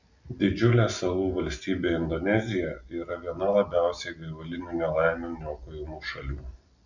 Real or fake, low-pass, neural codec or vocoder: real; 7.2 kHz; none